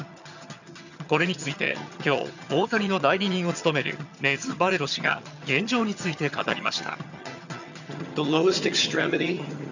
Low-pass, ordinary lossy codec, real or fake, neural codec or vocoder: 7.2 kHz; none; fake; vocoder, 22.05 kHz, 80 mel bands, HiFi-GAN